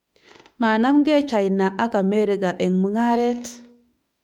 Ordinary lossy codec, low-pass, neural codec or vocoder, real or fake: MP3, 96 kbps; 19.8 kHz; autoencoder, 48 kHz, 32 numbers a frame, DAC-VAE, trained on Japanese speech; fake